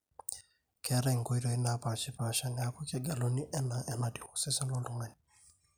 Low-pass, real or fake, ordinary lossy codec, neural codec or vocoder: none; real; none; none